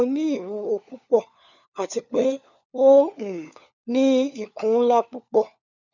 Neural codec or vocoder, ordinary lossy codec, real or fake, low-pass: codec, 16 kHz, 4 kbps, FunCodec, trained on LibriTTS, 50 frames a second; none; fake; 7.2 kHz